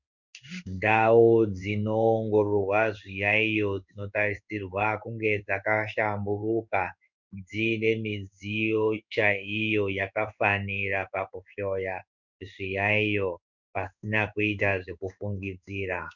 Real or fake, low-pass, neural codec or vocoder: fake; 7.2 kHz; codec, 16 kHz in and 24 kHz out, 1 kbps, XY-Tokenizer